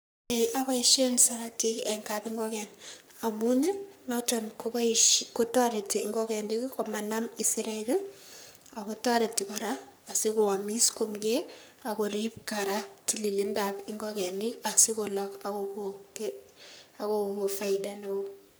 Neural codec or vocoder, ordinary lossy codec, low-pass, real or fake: codec, 44.1 kHz, 3.4 kbps, Pupu-Codec; none; none; fake